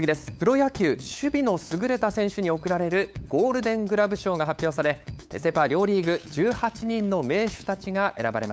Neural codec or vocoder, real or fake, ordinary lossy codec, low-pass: codec, 16 kHz, 8 kbps, FunCodec, trained on LibriTTS, 25 frames a second; fake; none; none